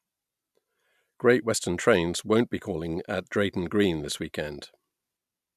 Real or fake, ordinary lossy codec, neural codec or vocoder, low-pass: real; none; none; 14.4 kHz